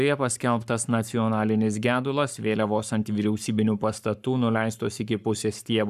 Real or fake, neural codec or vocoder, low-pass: fake; codec, 44.1 kHz, 7.8 kbps, Pupu-Codec; 14.4 kHz